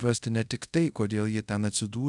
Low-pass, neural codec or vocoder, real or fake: 10.8 kHz; codec, 24 kHz, 0.5 kbps, DualCodec; fake